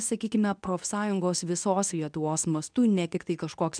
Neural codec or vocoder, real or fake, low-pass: codec, 24 kHz, 0.9 kbps, WavTokenizer, medium speech release version 2; fake; 9.9 kHz